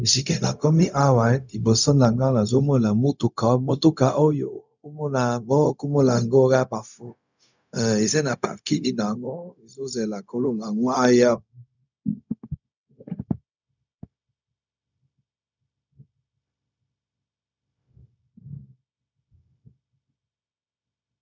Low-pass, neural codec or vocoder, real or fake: 7.2 kHz; codec, 16 kHz, 0.4 kbps, LongCat-Audio-Codec; fake